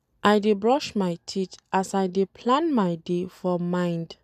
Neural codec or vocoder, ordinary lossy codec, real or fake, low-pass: none; none; real; 14.4 kHz